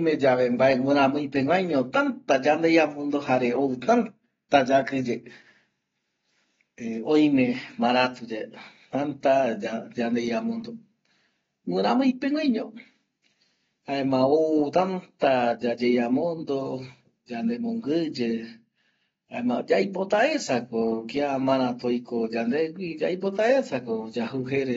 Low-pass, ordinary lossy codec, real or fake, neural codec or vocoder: 7.2 kHz; AAC, 24 kbps; real; none